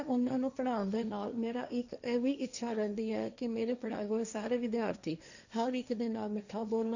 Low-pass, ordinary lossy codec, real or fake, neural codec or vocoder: 7.2 kHz; none; fake; codec, 16 kHz, 1.1 kbps, Voila-Tokenizer